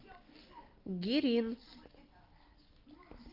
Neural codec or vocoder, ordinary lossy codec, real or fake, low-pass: none; Opus, 24 kbps; real; 5.4 kHz